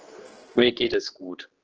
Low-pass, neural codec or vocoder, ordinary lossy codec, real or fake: 7.2 kHz; codec, 16 kHz, 2 kbps, X-Codec, HuBERT features, trained on general audio; Opus, 16 kbps; fake